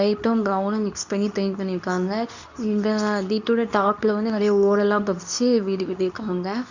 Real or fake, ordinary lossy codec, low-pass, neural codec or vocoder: fake; none; 7.2 kHz; codec, 24 kHz, 0.9 kbps, WavTokenizer, medium speech release version 2